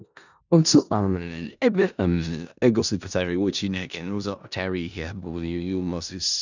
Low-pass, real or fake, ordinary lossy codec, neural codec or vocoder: 7.2 kHz; fake; none; codec, 16 kHz in and 24 kHz out, 0.4 kbps, LongCat-Audio-Codec, four codebook decoder